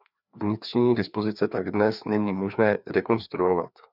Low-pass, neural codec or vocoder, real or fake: 5.4 kHz; codec, 16 kHz, 2 kbps, FreqCodec, larger model; fake